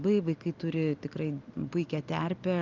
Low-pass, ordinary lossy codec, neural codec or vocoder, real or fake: 7.2 kHz; Opus, 24 kbps; none; real